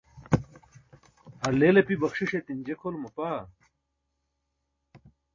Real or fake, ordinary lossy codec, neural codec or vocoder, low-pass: real; MP3, 32 kbps; none; 7.2 kHz